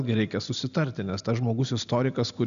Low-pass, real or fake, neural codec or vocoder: 7.2 kHz; real; none